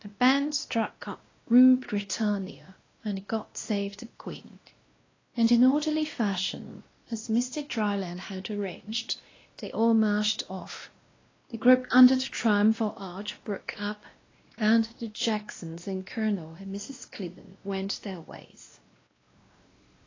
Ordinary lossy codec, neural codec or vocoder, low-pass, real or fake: AAC, 32 kbps; codec, 16 kHz, 1 kbps, X-Codec, WavLM features, trained on Multilingual LibriSpeech; 7.2 kHz; fake